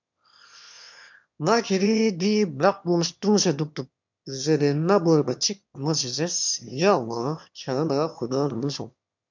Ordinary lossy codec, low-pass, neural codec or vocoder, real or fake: MP3, 64 kbps; 7.2 kHz; autoencoder, 22.05 kHz, a latent of 192 numbers a frame, VITS, trained on one speaker; fake